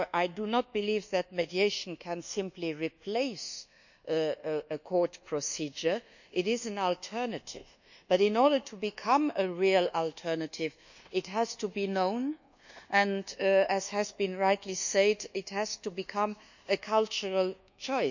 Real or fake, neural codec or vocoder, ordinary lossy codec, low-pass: fake; codec, 24 kHz, 1.2 kbps, DualCodec; none; 7.2 kHz